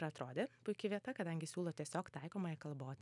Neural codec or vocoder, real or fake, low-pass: none; real; 10.8 kHz